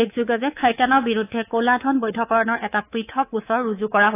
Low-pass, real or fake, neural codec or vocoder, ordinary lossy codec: 3.6 kHz; fake; codec, 24 kHz, 3.1 kbps, DualCodec; AAC, 24 kbps